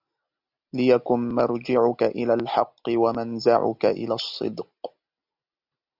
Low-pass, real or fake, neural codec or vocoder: 5.4 kHz; real; none